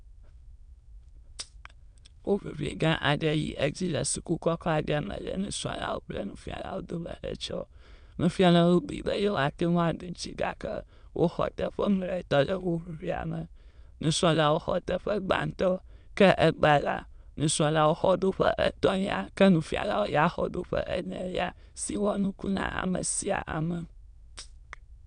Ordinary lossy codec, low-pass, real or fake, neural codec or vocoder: none; 9.9 kHz; fake; autoencoder, 22.05 kHz, a latent of 192 numbers a frame, VITS, trained on many speakers